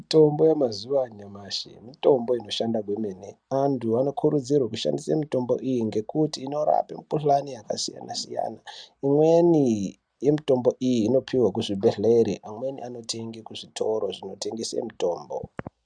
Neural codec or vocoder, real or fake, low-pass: none; real; 9.9 kHz